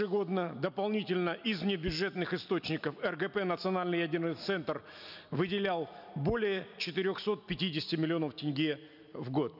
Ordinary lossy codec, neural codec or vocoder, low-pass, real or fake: none; none; 5.4 kHz; real